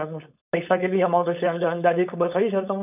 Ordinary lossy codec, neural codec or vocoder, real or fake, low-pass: none; codec, 16 kHz, 4.8 kbps, FACodec; fake; 3.6 kHz